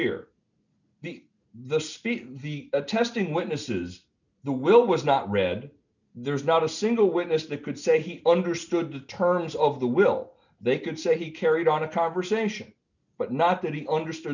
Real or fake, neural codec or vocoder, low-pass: real; none; 7.2 kHz